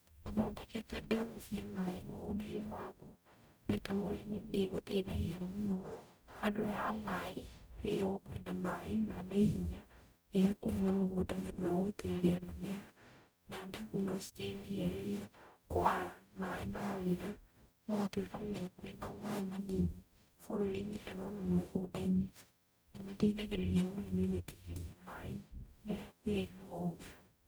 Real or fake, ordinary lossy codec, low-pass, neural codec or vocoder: fake; none; none; codec, 44.1 kHz, 0.9 kbps, DAC